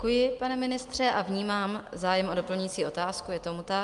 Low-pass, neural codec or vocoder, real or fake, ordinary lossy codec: 10.8 kHz; none; real; Opus, 32 kbps